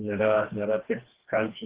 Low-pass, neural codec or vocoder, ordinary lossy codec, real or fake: 3.6 kHz; codec, 16 kHz, 2 kbps, FreqCodec, smaller model; Opus, 16 kbps; fake